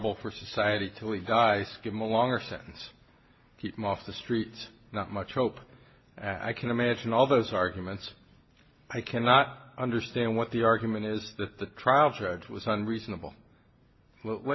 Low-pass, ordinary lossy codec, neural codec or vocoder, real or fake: 7.2 kHz; MP3, 24 kbps; none; real